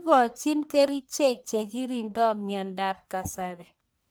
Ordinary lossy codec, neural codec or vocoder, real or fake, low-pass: none; codec, 44.1 kHz, 1.7 kbps, Pupu-Codec; fake; none